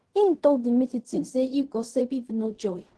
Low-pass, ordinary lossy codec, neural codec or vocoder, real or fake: 10.8 kHz; Opus, 16 kbps; codec, 16 kHz in and 24 kHz out, 0.4 kbps, LongCat-Audio-Codec, fine tuned four codebook decoder; fake